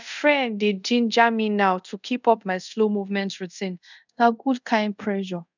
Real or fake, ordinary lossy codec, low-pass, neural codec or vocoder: fake; none; 7.2 kHz; codec, 24 kHz, 0.5 kbps, DualCodec